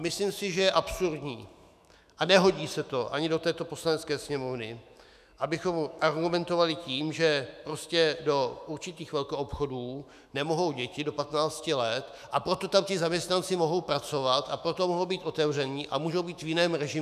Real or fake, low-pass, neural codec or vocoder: fake; 14.4 kHz; autoencoder, 48 kHz, 128 numbers a frame, DAC-VAE, trained on Japanese speech